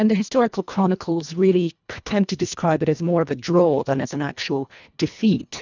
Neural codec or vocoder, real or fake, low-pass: codec, 24 kHz, 1.5 kbps, HILCodec; fake; 7.2 kHz